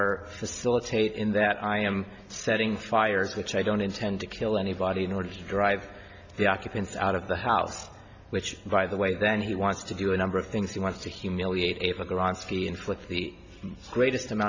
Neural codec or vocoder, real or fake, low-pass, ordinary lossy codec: none; real; 7.2 kHz; MP3, 48 kbps